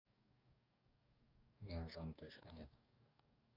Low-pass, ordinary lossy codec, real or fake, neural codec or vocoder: 5.4 kHz; Opus, 64 kbps; fake; codec, 44.1 kHz, 2.6 kbps, DAC